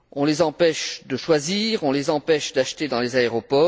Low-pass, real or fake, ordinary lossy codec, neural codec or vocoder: none; real; none; none